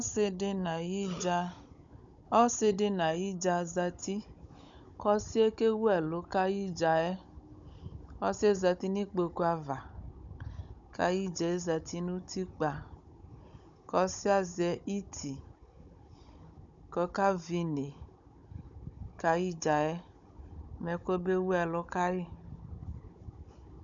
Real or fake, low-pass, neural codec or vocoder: fake; 7.2 kHz; codec, 16 kHz, 16 kbps, FunCodec, trained on Chinese and English, 50 frames a second